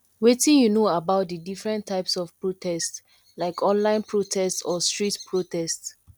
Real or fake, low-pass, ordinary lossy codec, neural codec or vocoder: real; 19.8 kHz; none; none